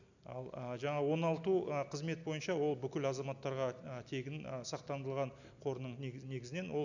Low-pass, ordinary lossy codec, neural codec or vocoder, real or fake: 7.2 kHz; none; none; real